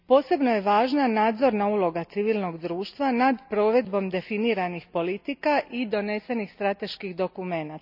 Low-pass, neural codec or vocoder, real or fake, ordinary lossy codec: 5.4 kHz; none; real; none